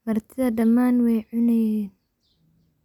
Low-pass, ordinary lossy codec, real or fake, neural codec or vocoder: 19.8 kHz; none; real; none